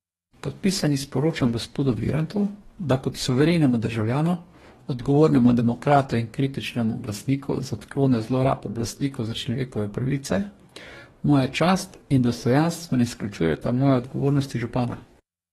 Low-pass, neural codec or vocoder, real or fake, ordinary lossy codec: 19.8 kHz; codec, 44.1 kHz, 2.6 kbps, DAC; fake; AAC, 32 kbps